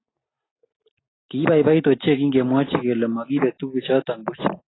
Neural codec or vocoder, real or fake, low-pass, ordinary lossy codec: none; real; 7.2 kHz; AAC, 16 kbps